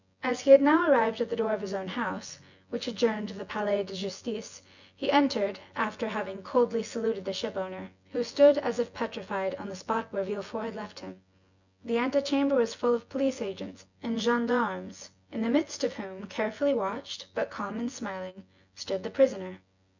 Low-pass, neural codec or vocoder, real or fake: 7.2 kHz; vocoder, 24 kHz, 100 mel bands, Vocos; fake